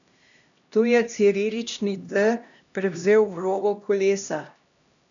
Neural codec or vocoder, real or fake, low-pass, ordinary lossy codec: codec, 16 kHz, 1 kbps, X-Codec, HuBERT features, trained on LibriSpeech; fake; 7.2 kHz; none